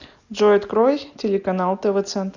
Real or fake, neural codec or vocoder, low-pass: real; none; 7.2 kHz